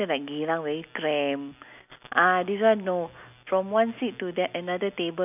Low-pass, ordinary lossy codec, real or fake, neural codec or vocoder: 3.6 kHz; none; real; none